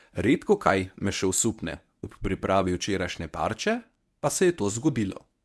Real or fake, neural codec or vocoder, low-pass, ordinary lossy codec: fake; codec, 24 kHz, 0.9 kbps, WavTokenizer, medium speech release version 1; none; none